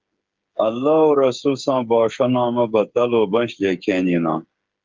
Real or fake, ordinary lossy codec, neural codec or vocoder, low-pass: fake; Opus, 24 kbps; codec, 16 kHz, 8 kbps, FreqCodec, smaller model; 7.2 kHz